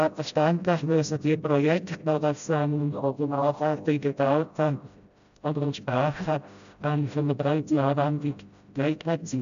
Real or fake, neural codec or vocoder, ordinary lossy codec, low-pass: fake; codec, 16 kHz, 0.5 kbps, FreqCodec, smaller model; MP3, 96 kbps; 7.2 kHz